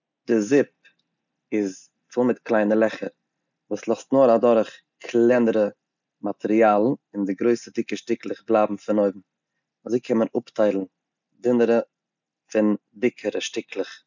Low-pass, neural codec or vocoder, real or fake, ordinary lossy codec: 7.2 kHz; none; real; none